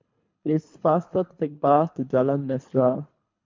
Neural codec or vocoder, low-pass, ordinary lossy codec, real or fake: codec, 24 kHz, 3 kbps, HILCodec; 7.2 kHz; MP3, 48 kbps; fake